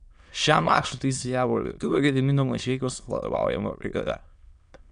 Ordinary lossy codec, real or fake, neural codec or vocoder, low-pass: MP3, 96 kbps; fake; autoencoder, 22.05 kHz, a latent of 192 numbers a frame, VITS, trained on many speakers; 9.9 kHz